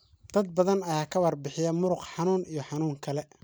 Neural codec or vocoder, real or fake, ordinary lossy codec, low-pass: none; real; none; none